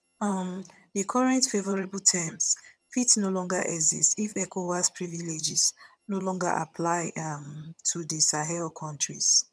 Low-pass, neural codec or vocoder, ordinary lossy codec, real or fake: none; vocoder, 22.05 kHz, 80 mel bands, HiFi-GAN; none; fake